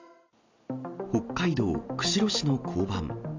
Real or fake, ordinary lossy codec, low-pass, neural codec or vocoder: real; AAC, 48 kbps; 7.2 kHz; none